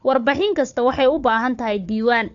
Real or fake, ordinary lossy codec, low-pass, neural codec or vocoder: fake; AAC, 64 kbps; 7.2 kHz; codec, 16 kHz, 4 kbps, FunCodec, trained on Chinese and English, 50 frames a second